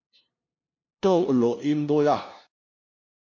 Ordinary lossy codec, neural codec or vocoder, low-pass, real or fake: MP3, 48 kbps; codec, 16 kHz, 0.5 kbps, FunCodec, trained on LibriTTS, 25 frames a second; 7.2 kHz; fake